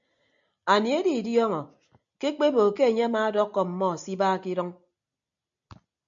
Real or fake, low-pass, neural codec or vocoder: real; 7.2 kHz; none